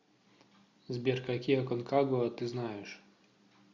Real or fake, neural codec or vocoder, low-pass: real; none; 7.2 kHz